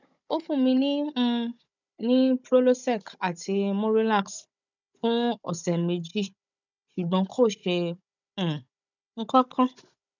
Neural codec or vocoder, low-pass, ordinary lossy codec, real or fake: codec, 16 kHz, 16 kbps, FunCodec, trained on Chinese and English, 50 frames a second; 7.2 kHz; none; fake